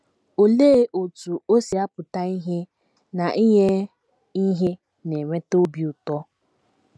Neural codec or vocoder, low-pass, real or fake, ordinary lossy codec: none; none; real; none